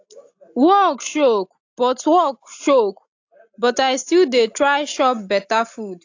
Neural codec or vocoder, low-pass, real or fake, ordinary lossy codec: none; 7.2 kHz; real; AAC, 48 kbps